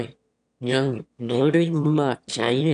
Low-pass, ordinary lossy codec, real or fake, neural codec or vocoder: 9.9 kHz; none; fake; autoencoder, 22.05 kHz, a latent of 192 numbers a frame, VITS, trained on one speaker